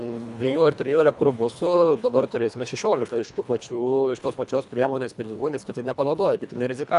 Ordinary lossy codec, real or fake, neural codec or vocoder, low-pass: MP3, 96 kbps; fake; codec, 24 kHz, 1.5 kbps, HILCodec; 10.8 kHz